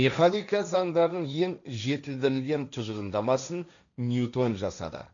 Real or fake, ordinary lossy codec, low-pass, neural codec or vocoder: fake; none; 7.2 kHz; codec, 16 kHz, 1.1 kbps, Voila-Tokenizer